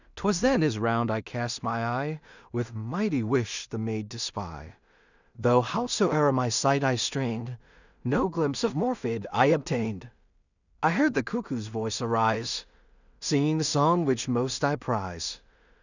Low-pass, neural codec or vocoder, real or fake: 7.2 kHz; codec, 16 kHz in and 24 kHz out, 0.4 kbps, LongCat-Audio-Codec, two codebook decoder; fake